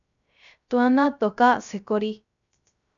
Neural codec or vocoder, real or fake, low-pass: codec, 16 kHz, 0.3 kbps, FocalCodec; fake; 7.2 kHz